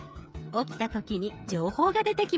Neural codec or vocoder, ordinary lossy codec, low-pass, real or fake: codec, 16 kHz, 8 kbps, FreqCodec, smaller model; none; none; fake